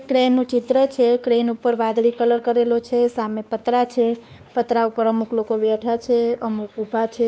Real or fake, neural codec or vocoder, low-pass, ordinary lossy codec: fake; codec, 16 kHz, 2 kbps, X-Codec, WavLM features, trained on Multilingual LibriSpeech; none; none